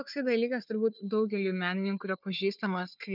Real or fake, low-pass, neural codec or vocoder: fake; 5.4 kHz; codec, 16 kHz, 4 kbps, FunCodec, trained on Chinese and English, 50 frames a second